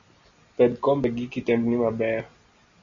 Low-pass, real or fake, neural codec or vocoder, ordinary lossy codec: 7.2 kHz; real; none; Opus, 64 kbps